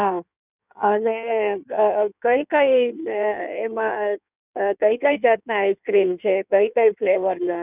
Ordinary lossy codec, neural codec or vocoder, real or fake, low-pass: none; codec, 16 kHz in and 24 kHz out, 1.1 kbps, FireRedTTS-2 codec; fake; 3.6 kHz